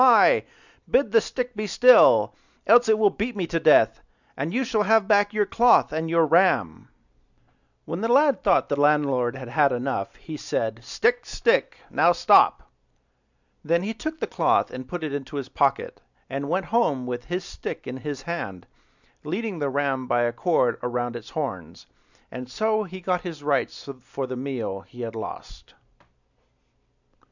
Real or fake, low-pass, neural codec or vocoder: real; 7.2 kHz; none